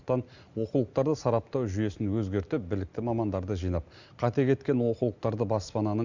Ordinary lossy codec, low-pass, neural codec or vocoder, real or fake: Opus, 64 kbps; 7.2 kHz; none; real